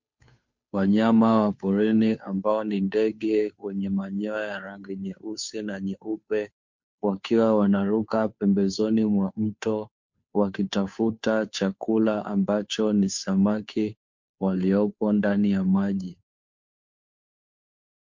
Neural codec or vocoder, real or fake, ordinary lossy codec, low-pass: codec, 16 kHz, 2 kbps, FunCodec, trained on Chinese and English, 25 frames a second; fake; MP3, 48 kbps; 7.2 kHz